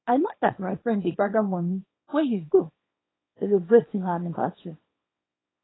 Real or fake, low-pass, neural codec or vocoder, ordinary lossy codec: fake; 7.2 kHz; codec, 24 kHz, 0.9 kbps, WavTokenizer, small release; AAC, 16 kbps